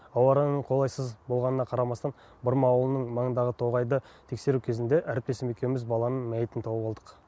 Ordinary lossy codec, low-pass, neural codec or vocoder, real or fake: none; none; none; real